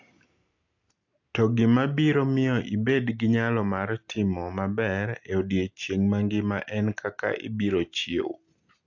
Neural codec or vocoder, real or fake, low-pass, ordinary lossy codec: none; real; 7.2 kHz; AAC, 48 kbps